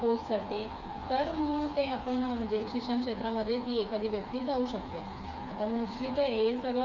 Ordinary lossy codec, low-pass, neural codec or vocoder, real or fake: none; 7.2 kHz; codec, 16 kHz, 4 kbps, FreqCodec, smaller model; fake